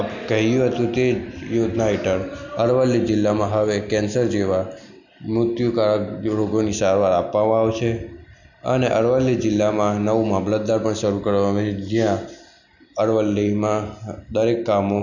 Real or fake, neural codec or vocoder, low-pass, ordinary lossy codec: real; none; 7.2 kHz; none